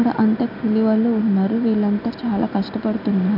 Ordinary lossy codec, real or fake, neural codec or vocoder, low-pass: none; real; none; 5.4 kHz